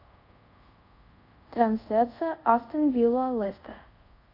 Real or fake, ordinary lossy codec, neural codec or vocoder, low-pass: fake; AAC, 48 kbps; codec, 24 kHz, 0.5 kbps, DualCodec; 5.4 kHz